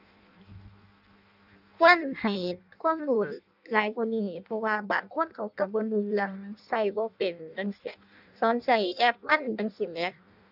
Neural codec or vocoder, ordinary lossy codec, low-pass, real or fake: codec, 16 kHz in and 24 kHz out, 0.6 kbps, FireRedTTS-2 codec; none; 5.4 kHz; fake